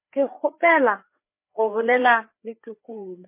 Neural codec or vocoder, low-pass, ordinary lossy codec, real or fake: codec, 16 kHz, 4 kbps, FreqCodec, larger model; 3.6 kHz; MP3, 24 kbps; fake